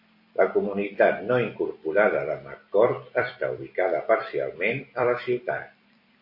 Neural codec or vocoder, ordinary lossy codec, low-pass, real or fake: vocoder, 44.1 kHz, 128 mel bands every 256 samples, BigVGAN v2; MP3, 32 kbps; 5.4 kHz; fake